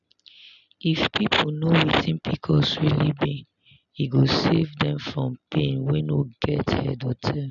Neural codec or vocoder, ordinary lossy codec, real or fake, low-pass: none; none; real; 7.2 kHz